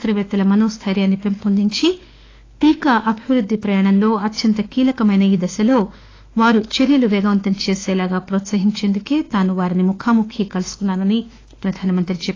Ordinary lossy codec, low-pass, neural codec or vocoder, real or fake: AAC, 32 kbps; 7.2 kHz; autoencoder, 48 kHz, 32 numbers a frame, DAC-VAE, trained on Japanese speech; fake